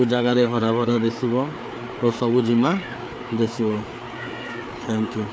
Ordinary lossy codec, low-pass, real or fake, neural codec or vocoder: none; none; fake; codec, 16 kHz, 4 kbps, FreqCodec, larger model